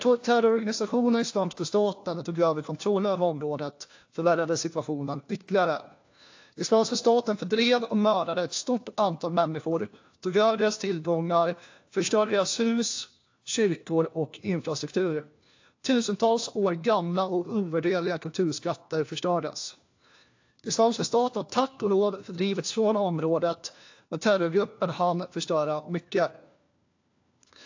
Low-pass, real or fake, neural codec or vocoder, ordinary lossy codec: 7.2 kHz; fake; codec, 16 kHz, 1 kbps, FunCodec, trained on LibriTTS, 50 frames a second; AAC, 48 kbps